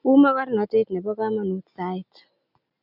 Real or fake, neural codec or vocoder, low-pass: real; none; 5.4 kHz